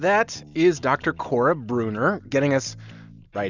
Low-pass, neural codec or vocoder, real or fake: 7.2 kHz; none; real